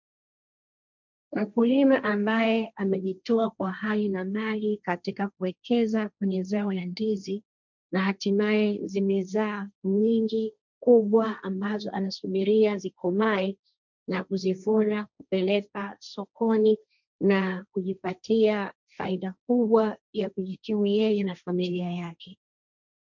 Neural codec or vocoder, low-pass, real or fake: codec, 16 kHz, 1.1 kbps, Voila-Tokenizer; 7.2 kHz; fake